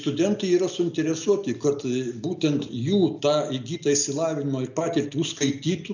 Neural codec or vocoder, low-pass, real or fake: none; 7.2 kHz; real